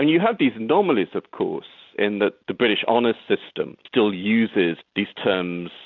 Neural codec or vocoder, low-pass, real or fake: none; 7.2 kHz; real